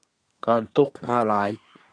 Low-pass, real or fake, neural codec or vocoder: 9.9 kHz; fake; codec, 24 kHz, 1 kbps, SNAC